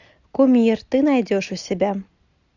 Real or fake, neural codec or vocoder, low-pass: real; none; 7.2 kHz